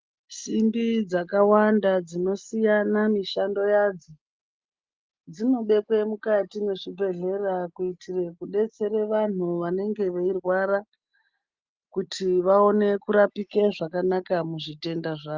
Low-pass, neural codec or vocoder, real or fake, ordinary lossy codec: 7.2 kHz; none; real; Opus, 32 kbps